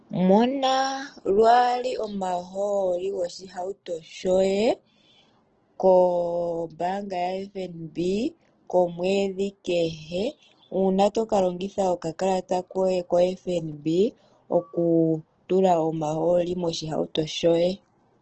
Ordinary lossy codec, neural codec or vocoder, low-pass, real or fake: Opus, 16 kbps; none; 7.2 kHz; real